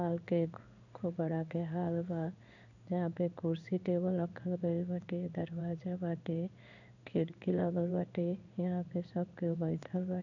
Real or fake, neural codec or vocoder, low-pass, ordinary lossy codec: fake; codec, 16 kHz in and 24 kHz out, 1 kbps, XY-Tokenizer; 7.2 kHz; none